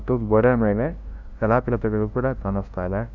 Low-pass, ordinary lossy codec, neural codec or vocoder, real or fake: 7.2 kHz; none; codec, 16 kHz, 0.5 kbps, FunCodec, trained on LibriTTS, 25 frames a second; fake